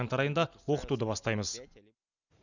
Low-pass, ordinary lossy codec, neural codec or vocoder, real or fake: 7.2 kHz; none; none; real